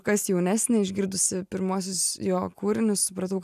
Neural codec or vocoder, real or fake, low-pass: none; real; 14.4 kHz